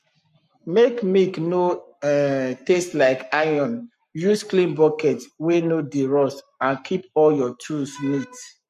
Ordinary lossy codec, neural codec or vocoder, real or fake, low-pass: MP3, 64 kbps; codec, 44.1 kHz, 7.8 kbps, Pupu-Codec; fake; 14.4 kHz